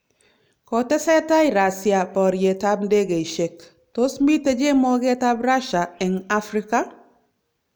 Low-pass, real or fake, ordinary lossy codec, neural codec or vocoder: none; real; none; none